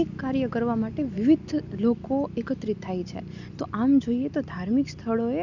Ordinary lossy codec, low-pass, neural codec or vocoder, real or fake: none; 7.2 kHz; none; real